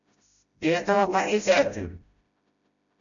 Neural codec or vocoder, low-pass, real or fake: codec, 16 kHz, 0.5 kbps, FreqCodec, smaller model; 7.2 kHz; fake